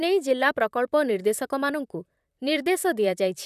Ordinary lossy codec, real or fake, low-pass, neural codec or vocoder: none; fake; 14.4 kHz; vocoder, 44.1 kHz, 128 mel bands, Pupu-Vocoder